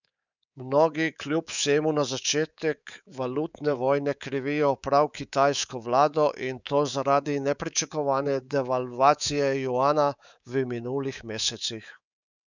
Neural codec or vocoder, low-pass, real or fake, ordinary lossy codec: codec, 24 kHz, 3.1 kbps, DualCodec; 7.2 kHz; fake; none